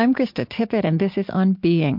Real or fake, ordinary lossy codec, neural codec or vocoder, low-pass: real; MP3, 48 kbps; none; 5.4 kHz